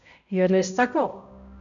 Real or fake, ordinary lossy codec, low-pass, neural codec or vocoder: fake; none; 7.2 kHz; codec, 16 kHz, 0.5 kbps, X-Codec, HuBERT features, trained on balanced general audio